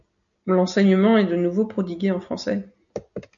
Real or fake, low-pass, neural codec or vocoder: real; 7.2 kHz; none